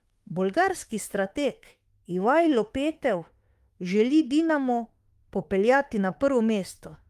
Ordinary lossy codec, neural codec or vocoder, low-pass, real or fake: Opus, 32 kbps; autoencoder, 48 kHz, 32 numbers a frame, DAC-VAE, trained on Japanese speech; 14.4 kHz; fake